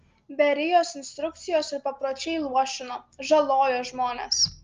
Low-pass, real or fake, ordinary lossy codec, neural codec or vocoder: 7.2 kHz; real; Opus, 32 kbps; none